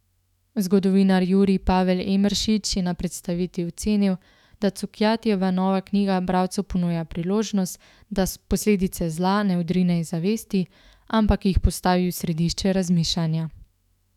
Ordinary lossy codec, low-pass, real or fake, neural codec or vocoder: none; 19.8 kHz; fake; autoencoder, 48 kHz, 128 numbers a frame, DAC-VAE, trained on Japanese speech